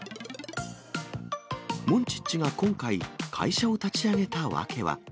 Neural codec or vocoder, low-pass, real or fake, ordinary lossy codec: none; none; real; none